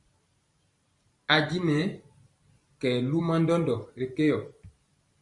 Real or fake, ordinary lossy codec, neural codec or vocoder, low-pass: fake; Opus, 64 kbps; vocoder, 44.1 kHz, 128 mel bands every 512 samples, BigVGAN v2; 10.8 kHz